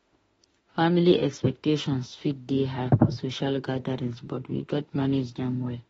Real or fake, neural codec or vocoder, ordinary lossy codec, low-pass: fake; autoencoder, 48 kHz, 32 numbers a frame, DAC-VAE, trained on Japanese speech; AAC, 24 kbps; 19.8 kHz